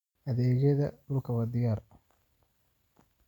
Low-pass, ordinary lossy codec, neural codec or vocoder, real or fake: 19.8 kHz; none; none; real